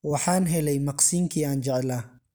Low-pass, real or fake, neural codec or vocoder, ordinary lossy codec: none; real; none; none